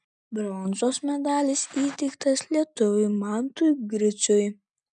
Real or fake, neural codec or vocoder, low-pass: real; none; 9.9 kHz